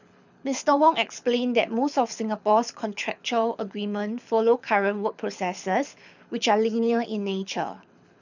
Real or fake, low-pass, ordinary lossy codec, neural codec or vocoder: fake; 7.2 kHz; none; codec, 24 kHz, 6 kbps, HILCodec